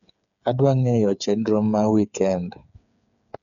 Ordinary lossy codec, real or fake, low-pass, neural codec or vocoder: none; fake; 7.2 kHz; codec, 16 kHz, 8 kbps, FreqCodec, smaller model